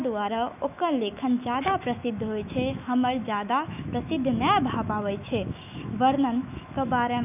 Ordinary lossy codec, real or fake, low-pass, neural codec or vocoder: none; fake; 3.6 kHz; autoencoder, 48 kHz, 128 numbers a frame, DAC-VAE, trained on Japanese speech